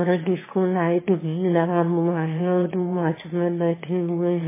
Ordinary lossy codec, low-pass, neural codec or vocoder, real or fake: MP3, 16 kbps; 3.6 kHz; autoencoder, 22.05 kHz, a latent of 192 numbers a frame, VITS, trained on one speaker; fake